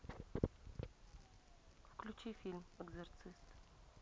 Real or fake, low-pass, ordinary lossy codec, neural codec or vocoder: real; none; none; none